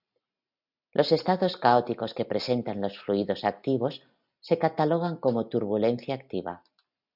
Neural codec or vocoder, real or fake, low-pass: none; real; 5.4 kHz